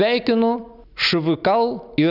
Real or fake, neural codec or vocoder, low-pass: real; none; 5.4 kHz